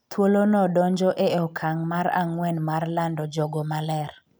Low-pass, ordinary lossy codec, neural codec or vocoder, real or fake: none; none; none; real